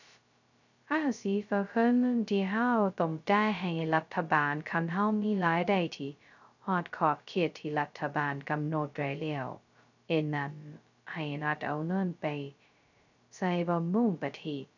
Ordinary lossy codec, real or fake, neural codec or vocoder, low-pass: none; fake; codec, 16 kHz, 0.2 kbps, FocalCodec; 7.2 kHz